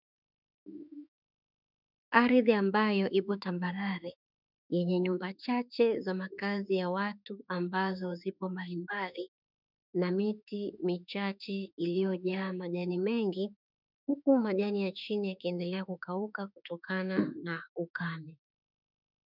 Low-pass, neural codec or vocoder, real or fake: 5.4 kHz; autoencoder, 48 kHz, 32 numbers a frame, DAC-VAE, trained on Japanese speech; fake